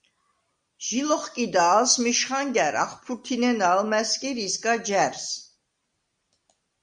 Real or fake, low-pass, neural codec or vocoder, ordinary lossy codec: real; 9.9 kHz; none; Opus, 64 kbps